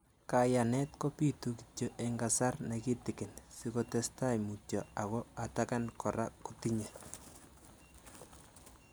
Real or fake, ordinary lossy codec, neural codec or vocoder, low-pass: real; none; none; none